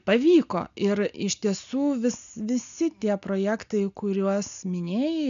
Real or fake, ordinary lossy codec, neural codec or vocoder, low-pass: real; MP3, 96 kbps; none; 7.2 kHz